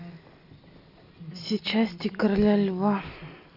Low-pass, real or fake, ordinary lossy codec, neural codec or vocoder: 5.4 kHz; real; none; none